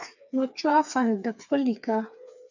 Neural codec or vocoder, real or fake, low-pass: codec, 16 kHz, 4 kbps, FreqCodec, smaller model; fake; 7.2 kHz